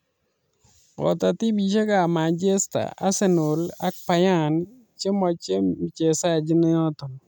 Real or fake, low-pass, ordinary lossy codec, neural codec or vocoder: real; none; none; none